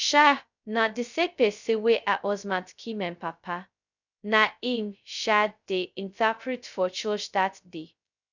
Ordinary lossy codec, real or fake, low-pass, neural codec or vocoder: none; fake; 7.2 kHz; codec, 16 kHz, 0.2 kbps, FocalCodec